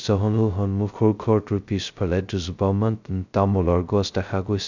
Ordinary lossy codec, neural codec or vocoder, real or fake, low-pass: none; codec, 16 kHz, 0.2 kbps, FocalCodec; fake; 7.2 kHz